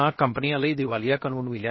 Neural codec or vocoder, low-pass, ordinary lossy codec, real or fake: codec, 16 kHz, 0.7 kbps, FocalCodec; 7.2 kHz; MP3, 24 kbps; fake